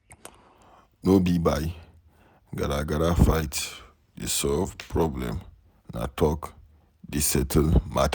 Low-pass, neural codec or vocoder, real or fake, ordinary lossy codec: none; none; real; none